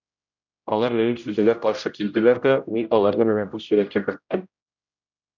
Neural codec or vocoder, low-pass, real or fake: codec, 16 kHz, 0.5 kbps, X-Codec, HuBERT features, trained on general audio; 7.2 kHz; fake